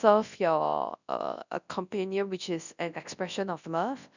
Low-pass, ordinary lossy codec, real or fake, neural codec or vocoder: 7.2 kHz; none; fake; codec, 24 kHz, 0.9 kbps, WavTokenizer, large speech release